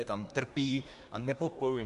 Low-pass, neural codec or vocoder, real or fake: 10.8 kHz; codec, 24 kHz, 1 kbps, SNAC; fake